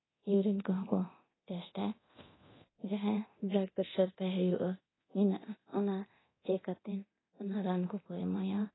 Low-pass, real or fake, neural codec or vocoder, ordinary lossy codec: 7.2 kHz; fake; codec, 24 kHz, 0.9 kbps, DualCodec; AAC, 16 kbps